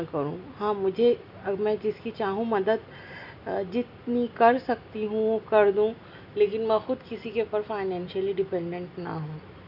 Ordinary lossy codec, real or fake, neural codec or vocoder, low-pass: none; real; none; 5.4 kHz